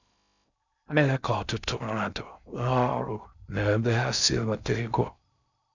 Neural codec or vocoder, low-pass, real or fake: codec, 16 kHz in and 24 kHz out, 0.6 kbps, FocalCodec, streaming, 2048 codes; 7.2 kHz; fake